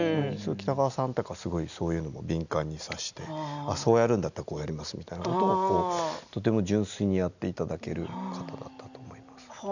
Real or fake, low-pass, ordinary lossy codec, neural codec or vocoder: real; 7.2 kHz; none; none